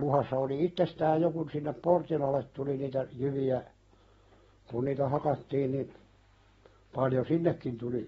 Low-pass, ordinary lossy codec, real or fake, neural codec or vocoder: 10.8 kHz; AAC, 24 kbps; real; none